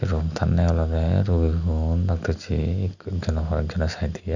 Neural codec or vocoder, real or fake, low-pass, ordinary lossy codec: none; real; 7.2 kHz; none